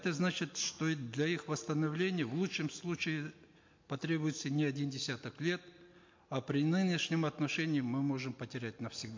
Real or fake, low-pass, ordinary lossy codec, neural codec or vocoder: real; 7.2 kHz; MP3, 48 kbps; none